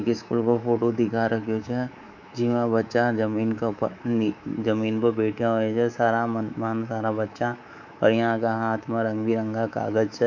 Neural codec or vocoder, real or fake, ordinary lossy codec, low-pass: codec, 24 kHz, 3.1 kbps, DualCodec; fake; none; 7.2 kHz